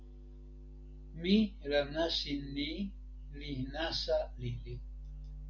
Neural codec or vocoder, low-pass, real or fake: none; 7.2 kHz; real